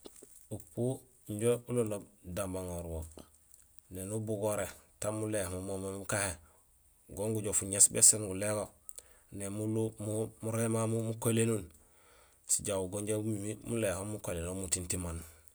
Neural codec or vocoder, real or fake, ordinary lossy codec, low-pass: none; real; none; none